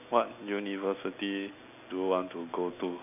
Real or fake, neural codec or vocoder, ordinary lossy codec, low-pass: real; none; none; 3.6 kHz